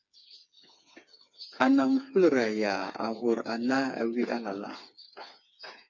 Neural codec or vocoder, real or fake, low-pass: codec, 16 kHz, 4 kbps, FreqCodec, smaller model; fake; 7.2 kHz